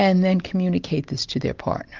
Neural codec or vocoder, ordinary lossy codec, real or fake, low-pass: none; Opus, 24 kbps; real; 7.2 kHz